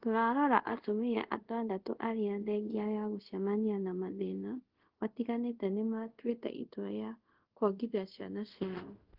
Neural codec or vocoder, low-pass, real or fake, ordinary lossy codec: codec, 24 kHz, 0.5 kbps, DualCodec; 5.4 kHz; fake; Opus, 16 kbps